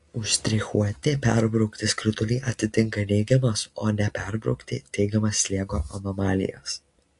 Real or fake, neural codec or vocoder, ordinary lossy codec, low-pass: real; none; AAC, 48 kbps; 10.8 kHz